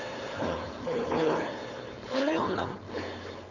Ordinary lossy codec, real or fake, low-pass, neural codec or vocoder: none; fake; 7.2 kHz; codec, 16 kHz, 4.8 kbps, FACodec